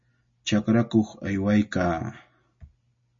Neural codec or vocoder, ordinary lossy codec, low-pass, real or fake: none; MP3, 32 kbps; 7.2 kHz; real